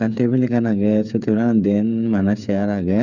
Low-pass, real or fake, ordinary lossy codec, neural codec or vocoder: 7.2 kHz; fake; none; codec, 16 kHz, 8 kbps, FreqCodec, smaller model